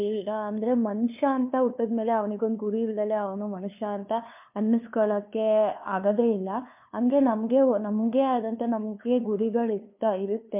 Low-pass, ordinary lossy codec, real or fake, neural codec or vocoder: 3.6 kHz; MP3, 32 kbps; fake; codec, 16 kHz, 2 kbps, FunCodec, trained on LibriTTS, 25 frames a second